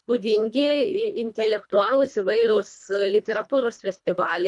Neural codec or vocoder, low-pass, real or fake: codec, 24 kHz, 1.5 kbps, HILCodec; 10.8 kHz; fake